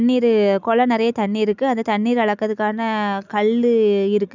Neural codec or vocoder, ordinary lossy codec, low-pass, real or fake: none; none; 7.2 kHz; real